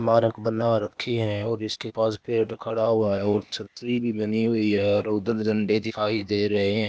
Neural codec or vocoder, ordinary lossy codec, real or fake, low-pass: codec, 16 kHz, 0.8 kbps, ZipCodec; none; fake; none